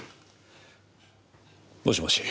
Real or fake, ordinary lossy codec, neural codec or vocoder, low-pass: real; none; none; none